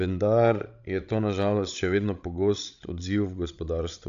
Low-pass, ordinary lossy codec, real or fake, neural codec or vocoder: 7.2 kHz; AAC, 96 kbps; fake; codec, 16 kHz, 16 kbps, FreqCodec, larger model